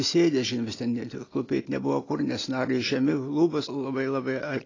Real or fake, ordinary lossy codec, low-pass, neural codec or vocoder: real; AAC, 32 kbps; 7.2 kHz; none